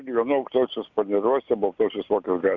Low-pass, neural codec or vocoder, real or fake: 7.2 kHz; none; real